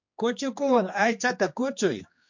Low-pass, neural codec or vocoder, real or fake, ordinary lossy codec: 7.2 kHz; codec, 16 kHz, 2 kbps, X-Codec, HuBERT features, trained on general audio; fake; MP3, 48 kbps